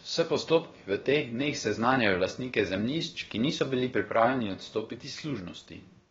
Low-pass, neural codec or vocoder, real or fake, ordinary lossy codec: 7.2 kHz; codec, 16 kHz, about 1 kbps, DyCAST, with the encoder's durations; fake; AAC, 24 kbps